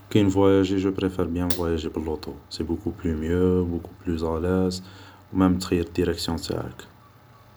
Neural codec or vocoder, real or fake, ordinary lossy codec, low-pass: none; real; none; none